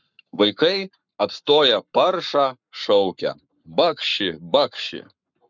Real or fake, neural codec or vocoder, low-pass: fake; codec, 44.1 kHz, 7.8 kbps, Pupu-Codec; 7.2 kHz